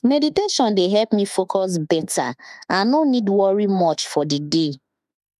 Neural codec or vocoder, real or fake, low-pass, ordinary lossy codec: autoencoder, 48 kHz, 32 numbers a frame, DAC-VAE, trained on Japanese speech; fake; 14.4 kHz; none